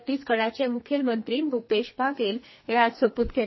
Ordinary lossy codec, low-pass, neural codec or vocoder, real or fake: MP3, 24 kbps; 7.2 kHz; codec, 44.1 kHz, 2.6 kbps, SNAC; fake